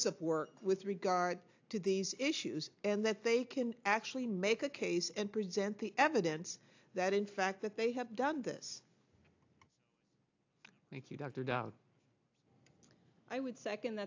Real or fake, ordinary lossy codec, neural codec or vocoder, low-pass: real; AAC, 48 kbps; none; 7.2 kHz